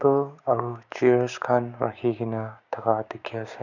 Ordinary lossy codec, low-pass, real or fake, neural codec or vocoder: none; 7.2 kHz; fake; codec, 16 kHz, 6 kbps, DAC